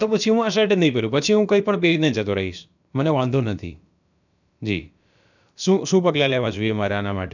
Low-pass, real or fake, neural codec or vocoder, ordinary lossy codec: 7.2 kHz; fake; codec, 16 kHz, about 1 kbps, DyCAST, with the encoder's durations; none